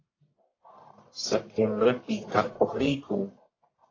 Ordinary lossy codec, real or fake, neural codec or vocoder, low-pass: AAC, 32 kbps; fake; codec, 44.1 kHz, 1.7 kbps, Pupu-Codec; 7.2 kHz